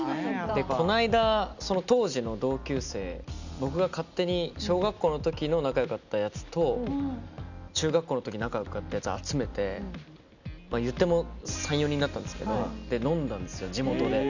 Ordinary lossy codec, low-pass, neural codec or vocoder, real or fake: none; 7.2 kHz; none; real